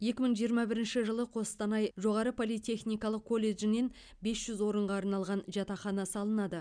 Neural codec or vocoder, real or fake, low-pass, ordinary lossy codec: none; real; 9.9 kHz; none